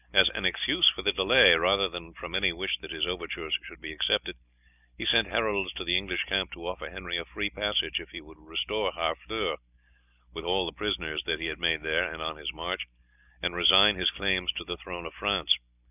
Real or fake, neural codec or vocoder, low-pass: real; none; 3.6 kHz